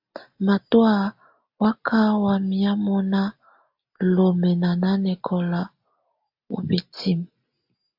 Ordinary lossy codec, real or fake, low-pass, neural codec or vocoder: AAC, 48 kbps; real; 5.4 kHz; none